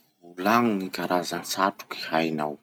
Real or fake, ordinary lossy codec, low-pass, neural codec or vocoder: real; none; none; none